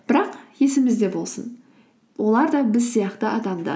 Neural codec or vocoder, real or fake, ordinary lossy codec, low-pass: none; real; none; none